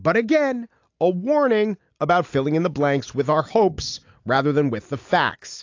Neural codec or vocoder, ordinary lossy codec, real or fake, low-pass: none; AAC, 48 kbps; real; 7.2 kHz